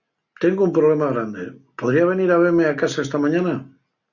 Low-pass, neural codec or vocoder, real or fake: 7.2 kHz; none; real